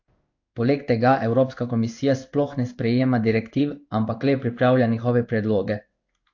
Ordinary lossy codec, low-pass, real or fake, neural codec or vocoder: AAC, 48 kbps; 7.2 kHz; fake; codec, 16 kHz in and 24 kHz out, 1 kbps, XY-Tokenizer